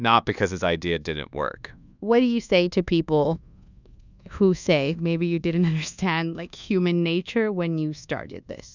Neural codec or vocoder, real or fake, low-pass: codec, 24 kHz, 1.2 kbps, DualCodec; fake; 7.2 kHz